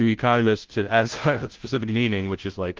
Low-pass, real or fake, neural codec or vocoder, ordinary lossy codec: 7.2 kHz; fake; codec, 16 kHz, 0.5 kbps, FunCodec, trained on Chinese and English, 25 frames a second; Opus, 16 kbps